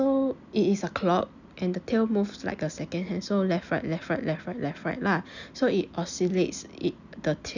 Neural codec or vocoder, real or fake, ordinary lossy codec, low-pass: none; real; none; 7.2 kHz